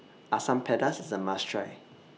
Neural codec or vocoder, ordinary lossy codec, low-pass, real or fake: none; none; none; real